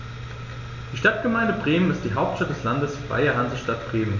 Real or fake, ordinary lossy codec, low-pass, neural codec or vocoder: real; none; 7.2 kHz; none